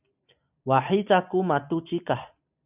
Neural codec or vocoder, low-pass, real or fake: none; 3.6 kHz; real